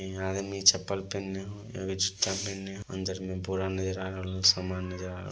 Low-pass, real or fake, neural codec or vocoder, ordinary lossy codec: none; real; none; none